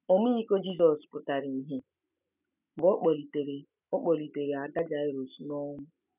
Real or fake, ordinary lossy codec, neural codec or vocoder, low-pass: real; none; none; 3.6 kHz